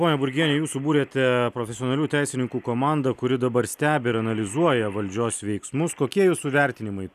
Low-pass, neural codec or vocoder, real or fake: 14.4 kHz; none; real